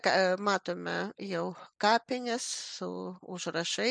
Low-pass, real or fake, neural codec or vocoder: 9.9 kHz; real; none